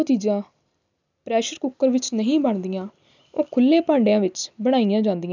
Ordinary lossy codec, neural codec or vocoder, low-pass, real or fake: none; none; 7.2 kHz; real